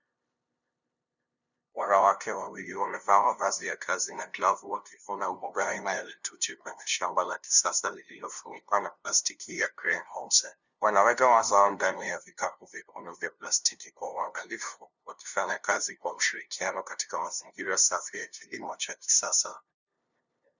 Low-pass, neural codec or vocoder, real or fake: 7.2 kHz; codec, 16 kHz, 0.5 kbps, FunCodec, trained on LibriTTS, 25 frames a second; fake